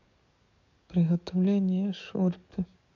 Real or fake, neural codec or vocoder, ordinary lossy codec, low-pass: fake; codec, 44.1 kHz, 7.8 kbps, DAC; none; 7.2 kHz